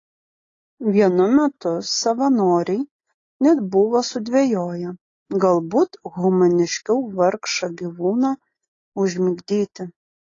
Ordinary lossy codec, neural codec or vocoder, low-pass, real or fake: AAC, 32 kbps; none; 7.2 kHz; real